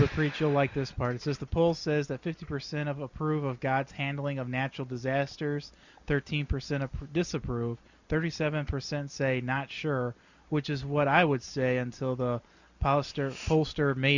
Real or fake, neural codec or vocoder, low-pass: real; none; 7.2 kHz